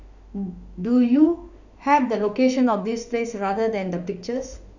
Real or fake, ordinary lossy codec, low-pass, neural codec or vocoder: fake; none; 7.2 kHz; autoencoder, 48 kHz, 32 numbers a frame, DAC-VAE, trained on Japanese speech